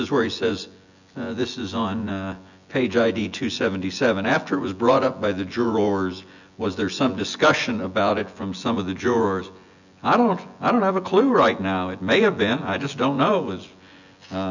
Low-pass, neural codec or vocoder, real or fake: 7.2 kHz; vocoder, 24 kHz, 100 mel bands, Vocos; fake